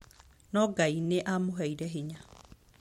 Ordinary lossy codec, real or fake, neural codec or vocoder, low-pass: MP3, 64 kbps; real; none; 19.8 kHz